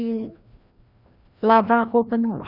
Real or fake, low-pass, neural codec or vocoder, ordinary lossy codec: fake; 5.4 kHz; codec, 16 kHz, 1 kbps, FreqCodec, larger model; none